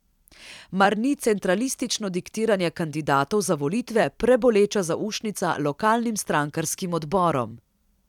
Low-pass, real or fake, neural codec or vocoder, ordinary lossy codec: 19.8 kHz; fake; vocoder, 44.1 kHz, 128 mel bands every 256 samples, BigVGAN v2; none